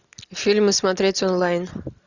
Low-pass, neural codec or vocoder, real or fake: 7.2 kHz; none; real